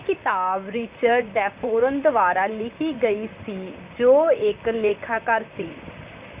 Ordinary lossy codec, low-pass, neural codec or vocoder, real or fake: Opus, 64 kbps; 3.6 kHz; vocoder, 44.1 kHz, 128 mel bands, Pupu-Vocoder; fake